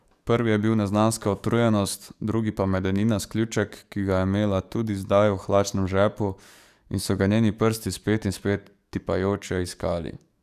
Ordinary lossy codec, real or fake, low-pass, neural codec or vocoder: none; fake; 14.4 kHz; codec, 44.1 kHz, 7.8 kbps, DAC